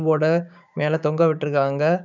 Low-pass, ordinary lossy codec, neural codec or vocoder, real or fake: 7.2 kHz; none; codec, 16 kHz, 6 kbps, DAC; fake